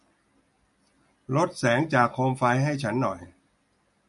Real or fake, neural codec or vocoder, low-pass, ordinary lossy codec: real; none; 14.4 kHz; MP3, 48 kbps